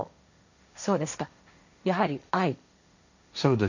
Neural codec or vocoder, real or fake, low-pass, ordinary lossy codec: codec, 16 kHz, 1.1 kbps, Voila-Tokenizer; fake; 7.2 kHz; none